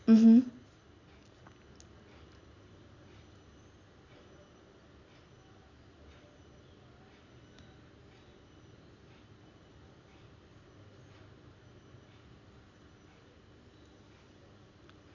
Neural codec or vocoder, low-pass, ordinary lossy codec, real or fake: none; 7.2 kHz; none; real